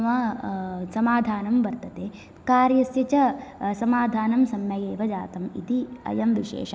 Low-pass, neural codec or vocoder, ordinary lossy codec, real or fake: none; none; none; real